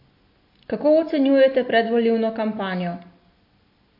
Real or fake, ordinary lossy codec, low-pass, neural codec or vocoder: real; MP3, 32 kbps; 5.4 kHz; none